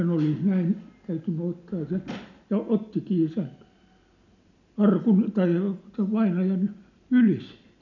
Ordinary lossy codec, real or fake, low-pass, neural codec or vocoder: none; real; 7.2 kHz; none